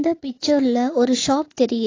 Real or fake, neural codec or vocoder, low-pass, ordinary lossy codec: fake; vocoder, 44.1 kHz, 80 mel bands, Vocos; 7.2 kHz; AAC, 32 kbps